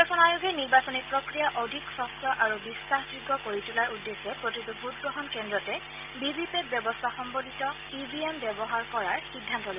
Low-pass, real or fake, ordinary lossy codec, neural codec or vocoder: 3.6 kHz; real; Opus, 24 kbps; none